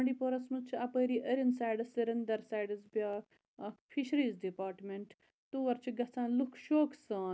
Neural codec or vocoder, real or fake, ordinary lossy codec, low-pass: none; real; none; none